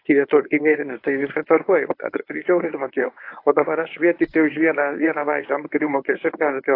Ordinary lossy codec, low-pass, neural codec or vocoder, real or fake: AAC, 32 kbps; 5.4 kHz; codec, 24 kHz, 0.9 kbps, WavTokenizer, medium speech release version 1; fake